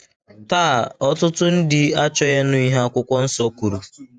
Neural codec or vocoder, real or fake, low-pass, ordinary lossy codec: vocoder, 48 kHz, 128 mel bands, Vocos; fake; 9.9 kHz; none